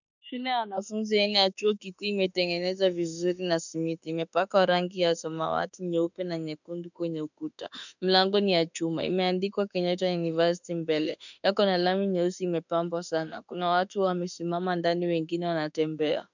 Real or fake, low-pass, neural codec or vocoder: fake; 7.2 kHz; autoencoder, 48 kHz, 32 numbers a frame, DAC-VAE, trained on Japanese speech